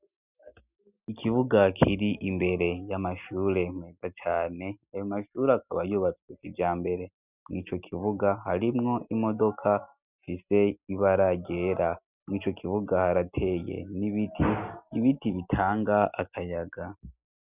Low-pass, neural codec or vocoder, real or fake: 3.6 kHz; none; real